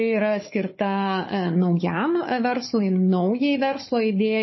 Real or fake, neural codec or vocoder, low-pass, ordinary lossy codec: fake; codec, 16 kHz, 4 kbps, FunCodec, trained on Chinese and English, 50 frames a second; 7.2 kHz; MP3, 24 kbps